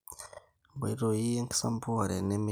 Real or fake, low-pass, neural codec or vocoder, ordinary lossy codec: real; none; none; none